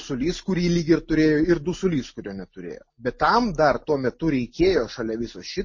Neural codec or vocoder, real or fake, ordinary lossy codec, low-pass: none; real; MP3, 32 kbps; 7.2 kHz